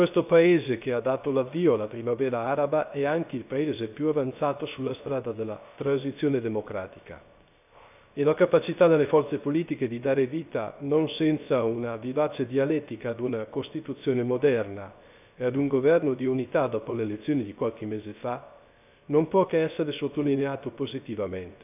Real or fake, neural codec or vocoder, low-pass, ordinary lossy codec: fake; codec, 16 kHz, 0.3 kbps, FocalCodec; 3.6 kHz; none